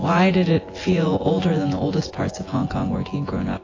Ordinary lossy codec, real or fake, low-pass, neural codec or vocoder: AAC, 32 kbps; fake; 7.2 kHz; vocoder, 24 kHz, 100 mel bands, Vocos